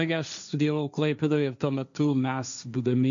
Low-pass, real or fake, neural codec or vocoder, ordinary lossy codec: 7.2 kHz; fake; codec, 16 kHz, 1.1 kbps, Voila-Tokenizer; MP3, 96 kbps